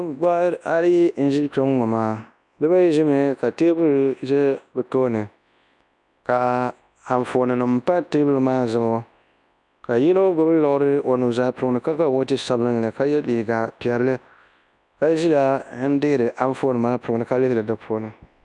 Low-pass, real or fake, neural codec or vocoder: 10.8 kHz; fake; codec, 24 kHz, 0.9 kbps, WavTokenizer, large speech release